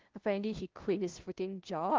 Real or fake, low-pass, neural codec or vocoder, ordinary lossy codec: fake; 7.2 kHz; codec, 16 kHz, 0.5 kbps, FunCodec, trained on LibriTTS, 25 frames a second; Opus, 16 kbps